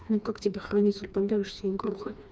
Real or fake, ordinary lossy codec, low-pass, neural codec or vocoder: fake; none; none; codec, 16 kHz, 2 kbps, FreqCodec, smaller model